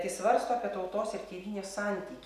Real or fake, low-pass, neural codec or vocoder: real; 14.4 kHz; none